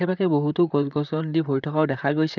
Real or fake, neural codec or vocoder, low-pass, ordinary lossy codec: fake; vocoder, 44.1 kHz, 128 mel bands, Pupu-Vocoder; 7.2 kHz; none